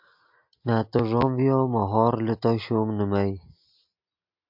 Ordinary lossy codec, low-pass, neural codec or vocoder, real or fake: MP3, 48 kbps; 5.4 kHz; none; real